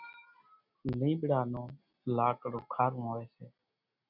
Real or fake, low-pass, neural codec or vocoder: real; 5.4 kHz; none